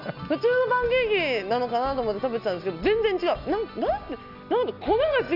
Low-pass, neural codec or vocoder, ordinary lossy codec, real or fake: 5.4 kHz; none; none; real